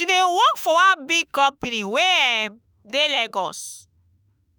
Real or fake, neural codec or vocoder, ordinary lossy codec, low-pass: fake; autoencoder, 48 kHz, 32 numbers a frame, DAC-VAE, trained on Japanese speech; none; none